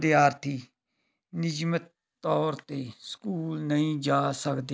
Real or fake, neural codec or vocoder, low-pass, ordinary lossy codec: real; none; none; none